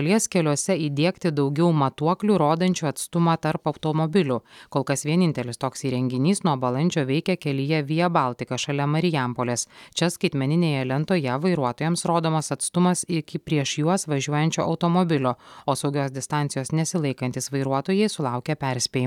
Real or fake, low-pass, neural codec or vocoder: real; 19.8 kHz; none